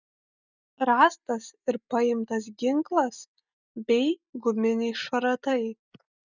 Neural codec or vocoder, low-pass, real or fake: none; 7.2 kHz; real